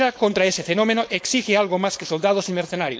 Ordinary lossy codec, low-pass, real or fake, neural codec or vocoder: none; none; fake; codec, 16 kHz, 4.8 kbps, FACodec